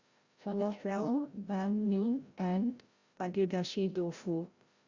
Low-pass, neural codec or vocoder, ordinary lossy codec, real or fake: 7.2 kHz; codec, 16 kHz, 0.5 kbps, FreqCodec, larger model; Opus, 64 kbps; fake